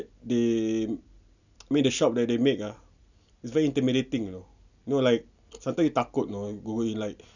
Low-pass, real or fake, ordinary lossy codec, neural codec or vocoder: 7.2 kHz; real; none; none